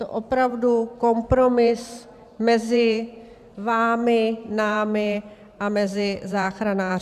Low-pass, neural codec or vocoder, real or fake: 14.4 kHz; vocoder, 44.1 kHz, 128 mel bands every 512 samples, BigVGAN v2; fake